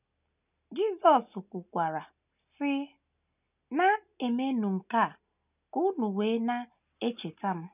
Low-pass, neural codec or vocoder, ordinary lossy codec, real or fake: 3.6 kHz; none; none; real